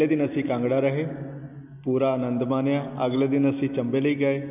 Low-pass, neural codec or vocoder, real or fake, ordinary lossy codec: 3.6 kHz; none; real; AAC, 32 kbps